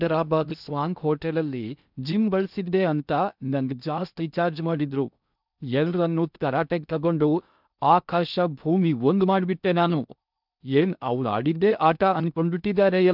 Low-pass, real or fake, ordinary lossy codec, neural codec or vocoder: 5.4 kHz; fake; none; codec, 16 kHz in and 24 kHz out, 0.6 kbps, FocalCodec, streaming, 2048 codes